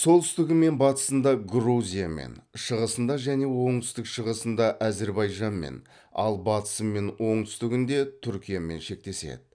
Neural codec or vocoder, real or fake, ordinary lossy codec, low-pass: none; real; none; 9.9 kHz